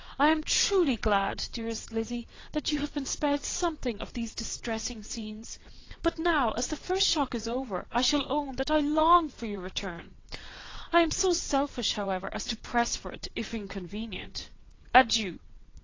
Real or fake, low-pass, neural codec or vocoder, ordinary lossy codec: fake; 7.2 kHz; vocoder, 22.05 kHz, 80 mel bands, WaveNeXt; AAC, 32 kbps